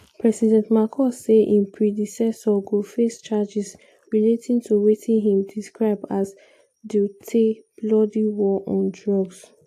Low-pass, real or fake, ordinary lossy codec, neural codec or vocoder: 14.4 kHz; real; AAC, 64 kbps; none